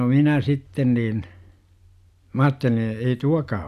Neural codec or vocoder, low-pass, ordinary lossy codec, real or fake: none; 14.4 kHz; none; real